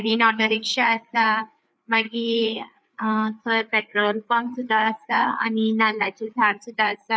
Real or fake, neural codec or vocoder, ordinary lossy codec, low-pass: fake; codec, 16 kHz, 4 kbps, FreqCodec, larger model; none; none